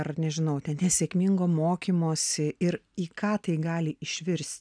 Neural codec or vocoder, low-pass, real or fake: none; 9.9 kHz; real